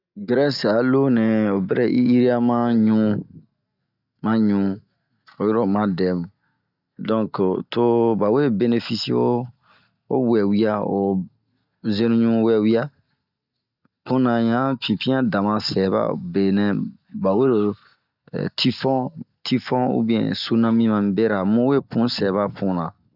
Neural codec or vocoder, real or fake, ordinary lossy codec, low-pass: none; real; none; 5.4 kHz